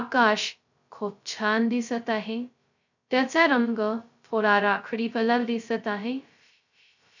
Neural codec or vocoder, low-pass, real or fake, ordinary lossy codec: codec, 16 kHz, 0.2 kbps, FocalCodec; 7.2 kHz; fake; none